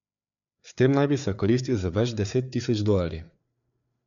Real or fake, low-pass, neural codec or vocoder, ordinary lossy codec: fake; 7.2 kHz; codec, 16 kHz, 4 kbps, FreqCodec, larger model; none